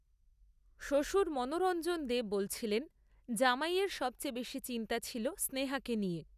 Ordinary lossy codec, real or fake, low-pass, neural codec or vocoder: none; real; 14.4 kHz; none